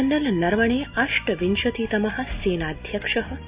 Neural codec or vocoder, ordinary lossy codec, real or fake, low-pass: none; Opus, 64 kbps; real; 3.6 kHz